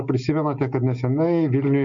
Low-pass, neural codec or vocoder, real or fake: 7.2 kHz; none; real